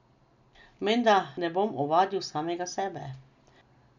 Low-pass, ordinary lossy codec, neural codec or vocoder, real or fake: 7.2 kHz; none; none; real